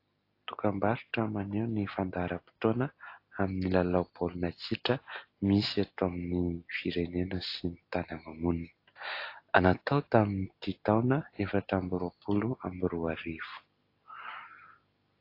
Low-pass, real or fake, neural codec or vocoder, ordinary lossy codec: 5.4 kHz; real; none; AAC, 32 kbps